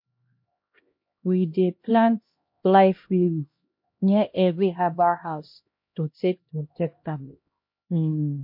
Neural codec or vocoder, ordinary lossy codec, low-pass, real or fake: codec, 16 kHz, 1 kbps, X-Codec, HuBERT features, trained on LibriSpeech; MP3, 32 kbps; 5.4 kHz; fake